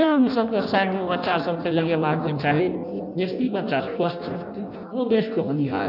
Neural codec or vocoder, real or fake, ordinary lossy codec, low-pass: codec, 16 kHz in and 24 kHz out, 0.6 kbps, FireRedTTS-2 codec; fake; none; 5.4 kHz